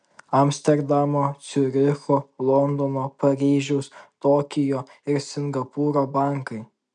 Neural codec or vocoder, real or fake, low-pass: none; real; 9.9 kHz